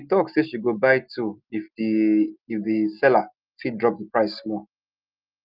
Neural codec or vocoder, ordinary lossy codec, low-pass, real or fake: none; Opus, 24 kbps; 5.4 kHz; real